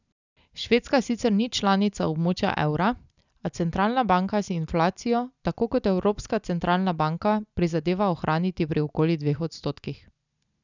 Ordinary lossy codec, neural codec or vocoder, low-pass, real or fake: none; none; 7.2 kHz; real